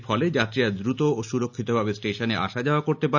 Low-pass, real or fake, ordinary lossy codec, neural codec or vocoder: 7.2 kHz; real; none; none